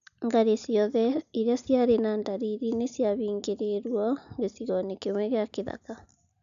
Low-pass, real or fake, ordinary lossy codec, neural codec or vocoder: 7.2 kHz; real; none; none